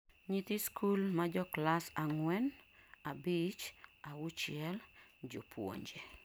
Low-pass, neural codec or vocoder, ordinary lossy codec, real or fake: none; none; none; real